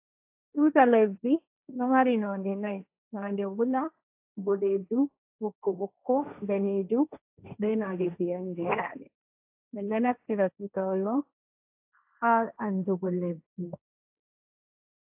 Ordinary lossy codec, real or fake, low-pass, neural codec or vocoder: AAC, 32 kbps; fake; 3.6 kHz; codec, 16 kHz, 1.1 kbps, Voila-Tokenizer